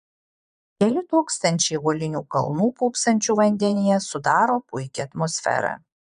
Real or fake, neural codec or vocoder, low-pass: fake; vocoder, 22.05 kHz, 80 mel bands, Vocos; 9.9 kHz